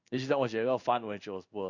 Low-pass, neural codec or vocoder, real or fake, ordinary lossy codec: 7.2 kHz; codec, 16 kHz in and 24 kHz out, 1 kbps, XY-Tokenizer; fake; none